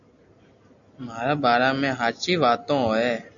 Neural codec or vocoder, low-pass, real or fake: none; 7.2 kHz; real